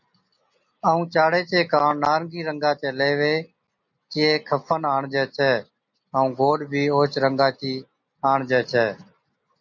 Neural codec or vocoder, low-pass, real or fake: none; 7.2 kHz; real